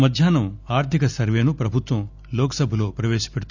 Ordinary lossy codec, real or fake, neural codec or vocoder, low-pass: none; real; none; 7.2 kHz